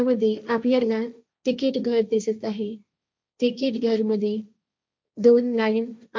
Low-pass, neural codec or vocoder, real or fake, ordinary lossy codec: none; codec, 16 kHz, 1.1 kbps, Voila-Tokenizer; fake; none